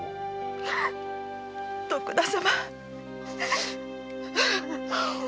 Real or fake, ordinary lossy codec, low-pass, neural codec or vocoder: real; none; none; none